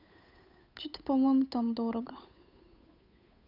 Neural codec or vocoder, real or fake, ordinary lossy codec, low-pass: codec, 16 kHz, 8 kbps, FunCodec, trained on Chinese and English, 25 frames a second; fake; none; 5.4 kHz